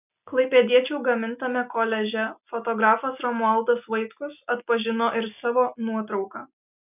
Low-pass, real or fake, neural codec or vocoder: 3.6 kHz; real; none